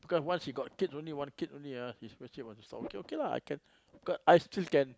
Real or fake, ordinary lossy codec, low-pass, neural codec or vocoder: real; none; none; none